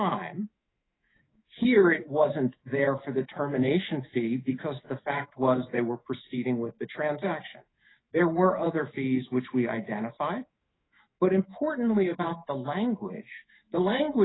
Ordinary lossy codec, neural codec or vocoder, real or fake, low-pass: AAC, 16 kbps; autoencoder, 48 kHz, 128 numbers a frame, DAC-VAE, trained on Japanese speech; fake; 7.2 kHz